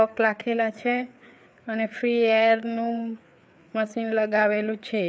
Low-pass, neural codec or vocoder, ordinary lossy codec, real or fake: none; codec, 16 kHz, 4 kbps, FreqCodec, larger model; none; fake